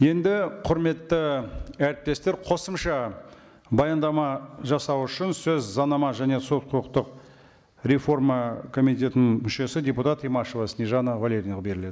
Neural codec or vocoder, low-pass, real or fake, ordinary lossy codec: none; none; real; none